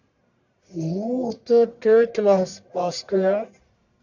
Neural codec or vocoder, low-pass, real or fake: codec, 44.1 kHz, 1.7 kbps, Pupu-Codec; 7.2 kHz; fake